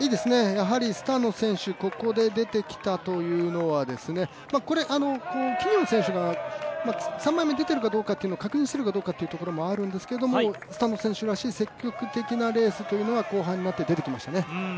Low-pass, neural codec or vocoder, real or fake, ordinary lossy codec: none; none; real; none